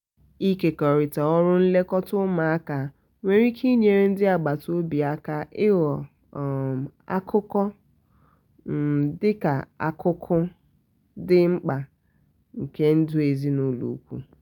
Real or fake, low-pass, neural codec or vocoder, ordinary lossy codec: real; 19.8 kHz; none; none